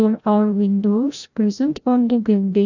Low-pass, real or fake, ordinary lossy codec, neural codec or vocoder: 7.2 kHz; fake; none; codec, 16 kHz, 0.5 kbps, FreqCodec, larger model